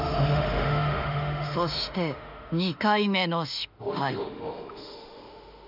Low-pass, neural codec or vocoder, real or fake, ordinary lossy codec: 5.4 kHz; autoencoder, 48 kHz, 32 numbers a frame, DAC-VAE, trained on Japanese speech; fake; none